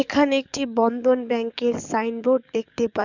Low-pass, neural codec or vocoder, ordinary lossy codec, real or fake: 7.2 kHz; codec, 44.1 kHz, 7.8 kbps, DAC; none; fake